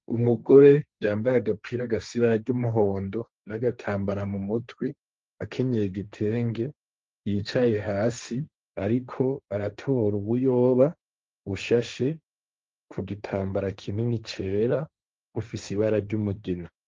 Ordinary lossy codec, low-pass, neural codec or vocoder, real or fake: Opus, 32 kbps; 7.2 kHz; codec, 16 kHz, 1.1 kbps, Voila-Tokenizer; fake